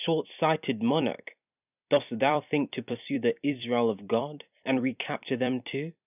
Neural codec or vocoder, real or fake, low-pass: vocoder, 44.1 kHz, 128 mel bands every 256 samples, BigVGAN v2; fake; 3.6 kHz